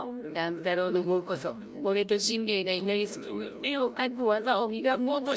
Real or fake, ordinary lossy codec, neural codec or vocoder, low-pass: fake; none; codec, 16 kHz, 0.5 kbps, FreqCodec, larger model; none